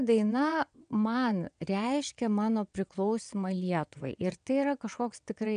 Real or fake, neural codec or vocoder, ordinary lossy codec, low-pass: fake; vocoder, 22.05 kHz, 80 mel bands, WaveNeXt; AAC, 96 kbps; 9.9 kHz